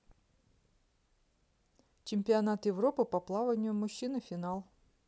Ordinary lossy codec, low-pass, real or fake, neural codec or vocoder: none; none; real; none